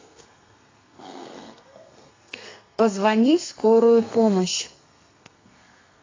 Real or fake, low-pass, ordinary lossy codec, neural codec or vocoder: fake; 7.2 kHz; AAC, 32 kbps; codec, 32 kHz, 1.9 kbps, SNAC